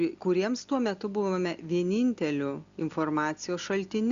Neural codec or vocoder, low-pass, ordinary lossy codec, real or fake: none; 7.2 kHz; Opus, 64 kbps; real